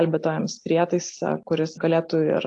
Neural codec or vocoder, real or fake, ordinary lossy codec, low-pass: none; real; AAC, 64 kbps; 10.8 kHz